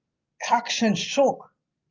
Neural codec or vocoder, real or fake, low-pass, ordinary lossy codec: none; real; 7.2 kHz; Opus, 24 kbps